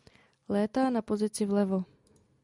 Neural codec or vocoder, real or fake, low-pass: none; real; 10.8 kHz